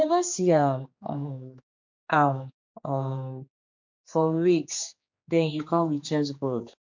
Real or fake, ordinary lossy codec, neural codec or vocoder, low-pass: fake; MP3, 48 kbps; codec, 16 kHz, 2 kbps, X-Codec, HuBERT features, trained on general audio; 7.2 kHz